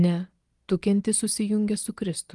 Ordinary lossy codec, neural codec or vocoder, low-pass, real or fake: Opus, 24 kbps; none; 10.8 kHz; real